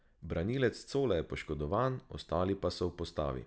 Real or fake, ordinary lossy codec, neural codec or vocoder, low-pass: real; none; none; none